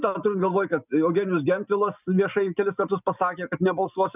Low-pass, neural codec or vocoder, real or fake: 3.6 kHz; none; real